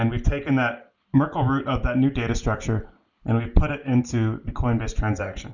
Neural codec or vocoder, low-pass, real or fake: none; 7.2 kHz; real